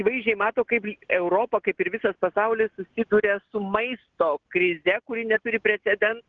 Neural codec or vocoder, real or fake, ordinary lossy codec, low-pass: none; real; Opus, 16 kbps; 7.2 kHz